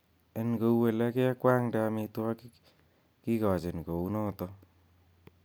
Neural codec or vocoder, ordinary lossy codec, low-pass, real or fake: none; none; none; real